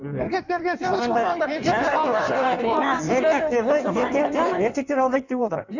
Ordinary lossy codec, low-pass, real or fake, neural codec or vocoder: none; 7.2 kHz; fake; codec, 16 kHz in and 24 kHz out, 1.1 kbps, FireRedTTS-2 codec